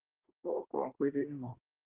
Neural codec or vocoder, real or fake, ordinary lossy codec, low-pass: codec, 24 kHz, 1 kbps, SNAC; fake; Opus, 16 kbps; 3.6 kHz